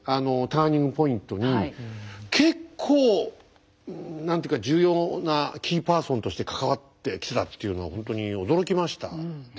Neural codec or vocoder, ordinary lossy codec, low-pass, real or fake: none; none; none; real